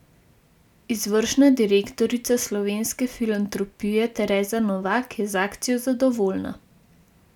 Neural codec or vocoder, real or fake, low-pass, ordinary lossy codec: none; real; 19.8 kHz; none